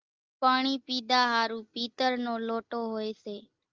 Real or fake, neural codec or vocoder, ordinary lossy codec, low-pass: real; none; Opus, 32 kbps; 7.2 kHz